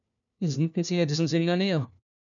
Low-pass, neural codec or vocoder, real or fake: 7.2 kHz; codec, 16 kHz, 1 kbps, FunCodec, trained on LibriTTS, 50 frames a second; fake